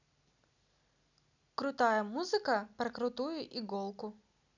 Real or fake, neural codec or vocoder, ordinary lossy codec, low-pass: real; none; none; 7.2 kHz